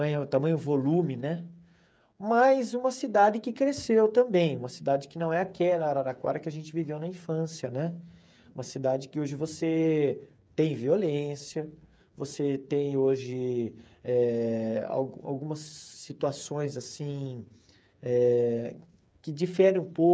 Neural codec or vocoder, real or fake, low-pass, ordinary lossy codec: codec, 16 kHz, 8 kbps, FreqCodec, smaller model; fake; none; none